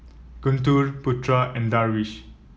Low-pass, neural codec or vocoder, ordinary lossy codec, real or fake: none; none; none; real